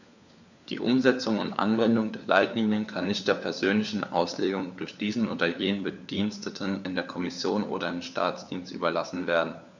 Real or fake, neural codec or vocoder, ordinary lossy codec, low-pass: fake; codec, 16 kHz, 4 kbps, FunCodec, trained on LibriTTS, 50 frames a second; none; 7.2 kHz